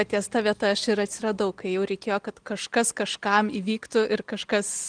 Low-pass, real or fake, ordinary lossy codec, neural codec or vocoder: 9.9 kHz; real; Opus, 24 kbps; none